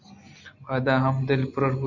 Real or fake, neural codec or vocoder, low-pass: real; none; 7.2 kHz